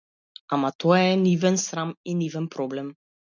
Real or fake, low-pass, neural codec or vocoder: real; 7.2 kHz; none